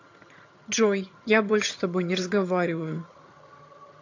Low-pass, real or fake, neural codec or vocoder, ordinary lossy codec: 7.2 kHz; fake; vocoder, 22.05 kHz, 80 mel bands, HiFi-GAN; AAC, 48 kbps